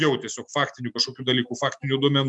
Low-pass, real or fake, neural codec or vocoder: 10.8 kHz; real; none